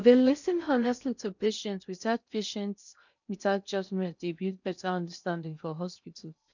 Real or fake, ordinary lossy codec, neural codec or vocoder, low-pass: fake; none; codec, 16 kHz in and 24 kHz out, 0.6 kbps, FocalCodec, streaming, 4096 codes; 7.2 kHz